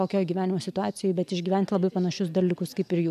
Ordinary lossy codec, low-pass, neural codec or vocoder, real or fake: MP3, 96 kbps; 14.4 kHz; none; real